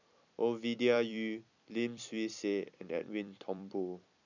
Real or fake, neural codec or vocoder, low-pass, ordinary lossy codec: fake; vocoder, 44.1 kHz, 128 mel bands every 256 samples, BigVGAN v2; 7.2 kHz; none